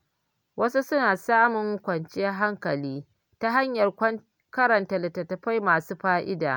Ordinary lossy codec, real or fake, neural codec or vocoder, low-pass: none; real; none; none